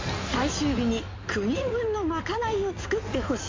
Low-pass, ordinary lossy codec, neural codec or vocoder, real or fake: 7.2 kHz; MP3, 48 kbps; codec, 16 kHz in and 24 kHz out, 2.2 kbps, FireRedTTS-2 codec; fake